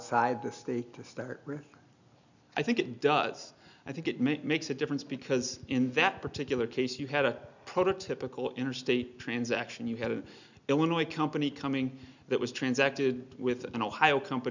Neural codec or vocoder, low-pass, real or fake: none; 7.2 kHz; real